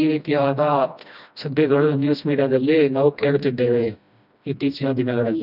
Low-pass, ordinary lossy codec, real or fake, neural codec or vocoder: 5.4 kHz; none; fake; codec, 16 kHz, 1 kbps, FreqCodec, smaller model